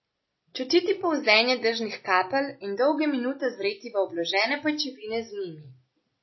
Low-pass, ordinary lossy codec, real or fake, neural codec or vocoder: 7.2 kHz; MP3, 24 kbps; real; none